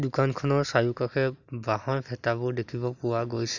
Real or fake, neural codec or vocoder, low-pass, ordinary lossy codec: real; none; 7.2 kHz; none